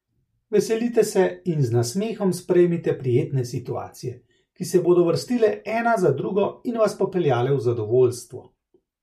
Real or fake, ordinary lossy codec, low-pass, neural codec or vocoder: real; MP3, 64 kbps; 14.4 kHz; none